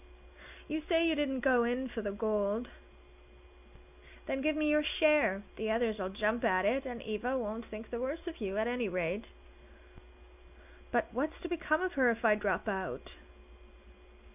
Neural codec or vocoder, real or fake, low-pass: none; real; 3.6 kHz